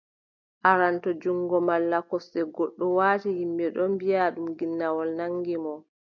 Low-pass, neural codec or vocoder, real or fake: 7.2 kHz; none; real